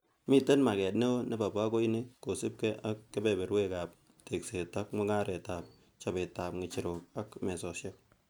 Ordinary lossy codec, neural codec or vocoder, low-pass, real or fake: none; none; none; real